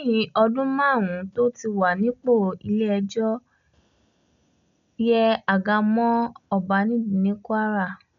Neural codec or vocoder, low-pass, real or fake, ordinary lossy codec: none; 7.2 kHz; real; MP3, 64 kbps